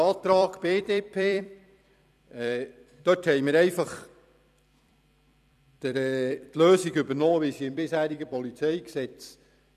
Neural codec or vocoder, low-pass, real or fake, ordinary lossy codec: vocoder, 44.1 kHz, 128 mel bands every 256 samples, BigVGAN v2; 14.4 kHz; fake; none